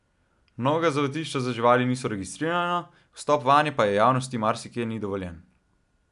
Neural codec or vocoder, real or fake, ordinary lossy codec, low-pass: none; real; none; 10.8 kHz